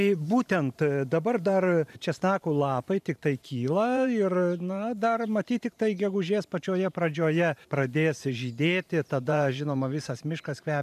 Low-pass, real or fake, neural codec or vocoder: 14.4 kHz; fake; vocoder, 44.1 kHz, 128 mel bands every 512 samples, BigVGAN v2